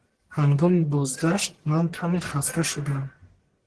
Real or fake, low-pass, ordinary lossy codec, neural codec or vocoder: fake; 10.8 kHz; Opus, 16 kbps; codec, 44.1 kHz, 1.7 kbps, Pupu-Codec